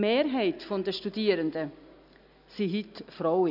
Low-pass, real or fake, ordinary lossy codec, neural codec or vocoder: 5.4 kHz; real; none; none